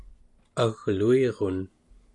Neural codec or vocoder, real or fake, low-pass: none; real; 10.8 kHz